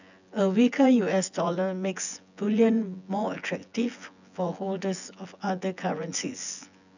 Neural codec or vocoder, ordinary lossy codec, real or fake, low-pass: vocoder, 24 kHz, 100 mel bands, Vocos; none; fake; 7.2 kHz